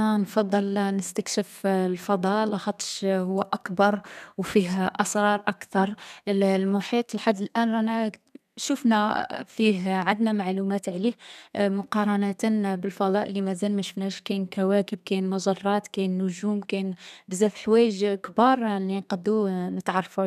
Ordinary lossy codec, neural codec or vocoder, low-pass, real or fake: none; codec, 32 kHz, 1.9 kbps, SNAC; 14.4 kHz; fake